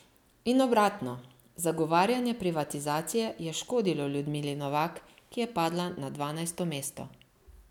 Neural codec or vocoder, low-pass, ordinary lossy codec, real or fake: none; 19.8 kHz; none; real